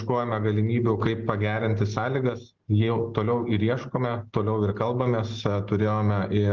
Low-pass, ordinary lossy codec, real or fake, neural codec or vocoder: 7.2 kHz; Opus, 16 kbps; real; none